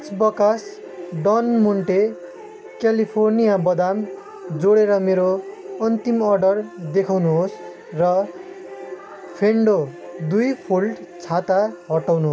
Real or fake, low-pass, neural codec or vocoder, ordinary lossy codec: real; none; none; none